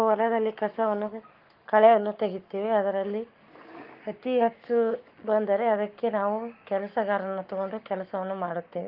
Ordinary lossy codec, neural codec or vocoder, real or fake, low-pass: Opus, 32 kbps; codec, 44.1 kHz, 7.8 kbps, Pupu-Codec; fake; 5.4 kHz